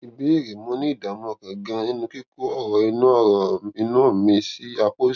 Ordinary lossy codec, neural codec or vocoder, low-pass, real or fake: none; none; 7.2 kHz; real